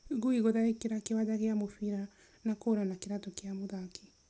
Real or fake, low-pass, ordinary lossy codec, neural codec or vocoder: real; none; none; none